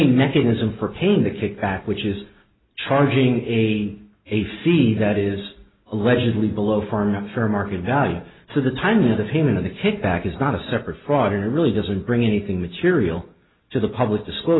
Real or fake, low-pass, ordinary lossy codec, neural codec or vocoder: real; 7.2 kHz; AAC, 16 kbps; none